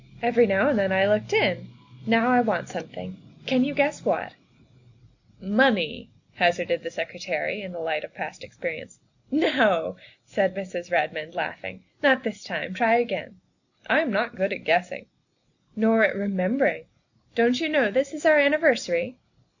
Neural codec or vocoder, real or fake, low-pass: none; real; 7.2 kHz